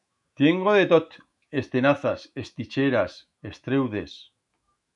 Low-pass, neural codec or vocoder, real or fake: 10.8 kHz; autoencoder, 48 kHz, 128 numbers a frame, DAC-VAE, trained on Japanese speech; fake